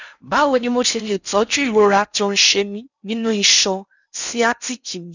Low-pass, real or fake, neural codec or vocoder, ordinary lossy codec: 7.2 kHz; fake; codec, 16 kHz in and 24 kHz out, 0.6 kbps, FocalCodec, streaming, 4096 codes; none